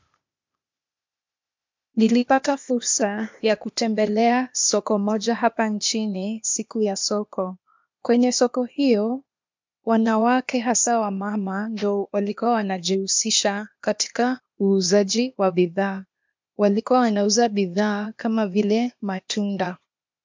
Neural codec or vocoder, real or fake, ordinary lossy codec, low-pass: codec, 16 kHz, 0.8 kbps, ZipCodec; fake; MP3, 64 kbps; 7.2 kHz